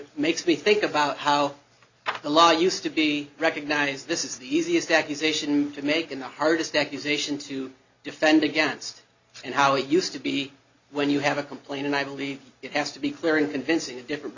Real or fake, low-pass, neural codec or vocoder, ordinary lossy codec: real; 7.2 kHz; none; Opus, 64 kbps